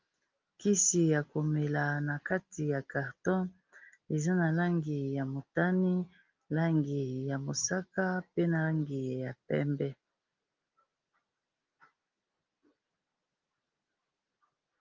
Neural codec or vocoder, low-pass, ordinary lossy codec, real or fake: none; 7.2 kHz; Opus, 32 kbps; real